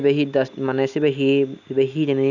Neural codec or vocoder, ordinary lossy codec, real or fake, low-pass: none; none; real; 7.2 kHz